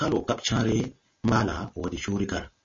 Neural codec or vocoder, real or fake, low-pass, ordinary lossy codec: none; real; 7.2 kHz; MP3, 32 kbps